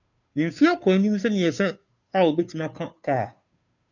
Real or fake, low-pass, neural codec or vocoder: fake; 7.2 kHz; codec, 16 kHz, 2 kbps, FunCodec, trained on Chinese and English, 25 frames a second